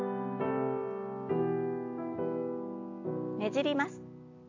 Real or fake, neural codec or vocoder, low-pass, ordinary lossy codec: real; none; 7.2 kHz; AAC, 48 kbps